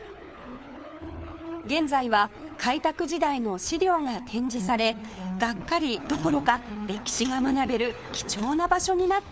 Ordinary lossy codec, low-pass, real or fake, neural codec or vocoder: none; none; fake; codec, 16 kHz, 4 kbps, FunCodec, trained on LibriTTS, 50 frames a second